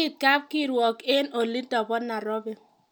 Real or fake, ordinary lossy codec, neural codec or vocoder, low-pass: real; none; none; none